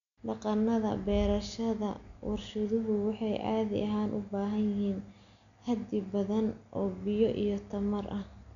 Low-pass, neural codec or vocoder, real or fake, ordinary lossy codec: 7.2 kHz; none; real; none